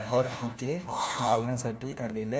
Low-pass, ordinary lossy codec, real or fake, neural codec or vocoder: none; none; fake; codec, 16 kHz, 1 kbps, FunCodec, trained on LibriTTS, 50 frames a second